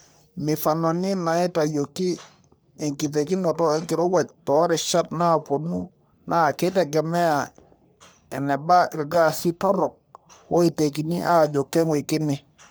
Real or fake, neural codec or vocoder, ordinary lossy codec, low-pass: fake; codec, 44.1 kHz, 3.4 kbps, Pupu-Codec; none; none